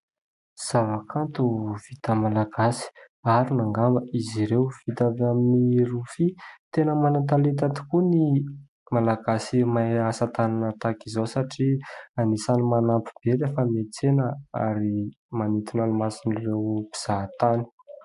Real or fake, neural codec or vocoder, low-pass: real; none; 10.8 kHz